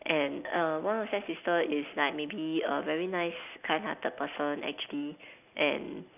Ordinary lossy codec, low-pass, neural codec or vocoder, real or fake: none; 3.6 kHz; none; real